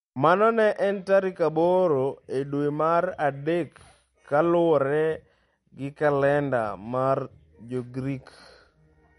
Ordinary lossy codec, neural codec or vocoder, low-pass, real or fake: MP3, 48 kbps; none; 19.8 kHz; real